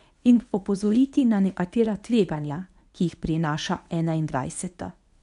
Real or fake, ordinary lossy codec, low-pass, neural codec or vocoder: fake; none; 10.8 kHz; codec, 24 kHz, 0.9 kbps, WavTokenizer, medium speech release version 1